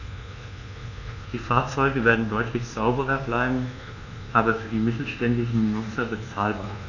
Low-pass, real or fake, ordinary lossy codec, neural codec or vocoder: 7.2 kHz; fake; none; codec, 24 kHz, 1.2 kbps, DualCodec